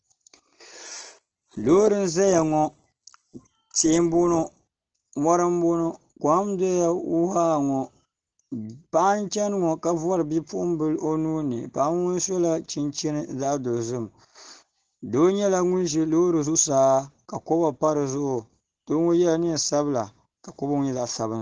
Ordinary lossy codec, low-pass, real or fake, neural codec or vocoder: Opus, 16 kbps; 7.2 kHz; real; none